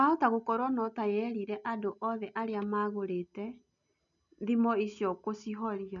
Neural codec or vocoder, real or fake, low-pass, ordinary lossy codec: none; real; 7.2 kHz; none